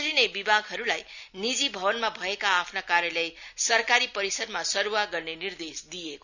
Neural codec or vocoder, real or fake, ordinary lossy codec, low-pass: none; real; none; 7.2 kHz